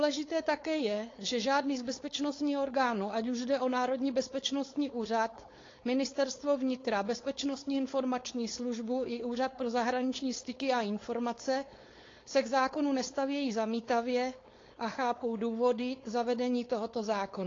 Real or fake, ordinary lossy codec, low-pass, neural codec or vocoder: fake; AAC, 32 kbps; 7.2 kHz; codec, 16 kHz, 4.8 kbps, FACodec